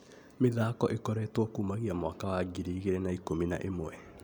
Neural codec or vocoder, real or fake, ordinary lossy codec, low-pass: none; real; none; 19.8 kHz